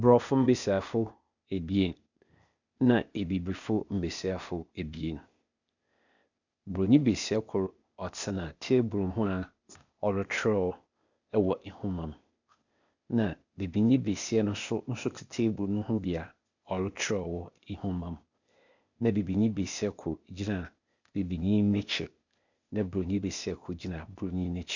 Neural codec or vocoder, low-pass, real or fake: codec, 16 kHz, 0.8 kbps, ZipCodec; 7.2 kHz; fake